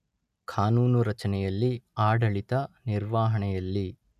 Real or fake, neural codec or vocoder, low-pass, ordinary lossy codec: real; none; 14.4 kHz; none